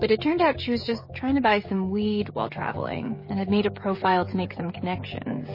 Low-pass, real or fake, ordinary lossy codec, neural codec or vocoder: 5.4 kHz; fake; MP3, 24 kbps; codec, 16 kHz, 16 kbps, FreqCodec, smaller model